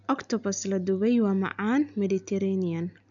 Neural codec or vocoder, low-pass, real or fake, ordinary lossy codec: none; 7.2 kHz; real; none